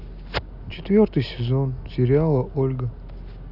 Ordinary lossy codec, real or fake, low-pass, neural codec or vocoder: none; real; 5.4 kHz; none